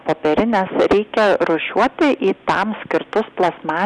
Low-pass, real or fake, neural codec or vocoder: 10.8 kHz; real; none